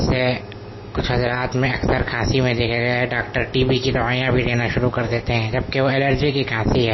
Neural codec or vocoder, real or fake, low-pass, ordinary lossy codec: none; real; 7.2 kHz; MP3, 24 kbps